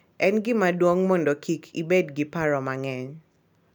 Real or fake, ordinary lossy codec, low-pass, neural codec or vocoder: real; none; 19.8 kHz; none